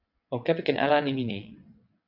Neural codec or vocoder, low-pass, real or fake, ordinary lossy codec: vocoder, 22.05 kHz, 80 mel bands, WaveNeXt; 5.4 kHz; fake; AAC, 48 kbps